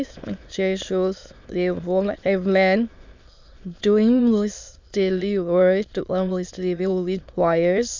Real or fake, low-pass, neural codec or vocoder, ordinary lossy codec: fake; 7.2 kHz; autoencoder, 22.05 kHz, a latent of 192 numbers a frame, VITS, trained on many speakers; MP3, 64 kbps